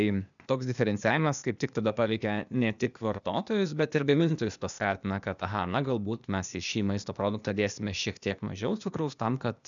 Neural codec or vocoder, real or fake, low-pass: codec, 16 kHz, 0.8 kbps, ZipCodec; fake; 7.2 kHz